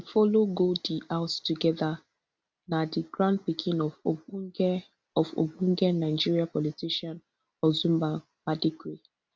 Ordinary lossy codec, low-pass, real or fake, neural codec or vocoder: none; none; real; none